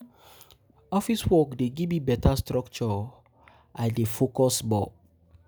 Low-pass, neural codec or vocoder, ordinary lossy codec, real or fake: none; none; none; real